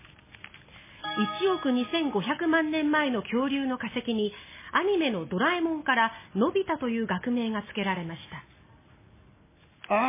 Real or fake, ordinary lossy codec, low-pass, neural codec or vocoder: real; MP3, 16 kbps; 3.6 kHz; none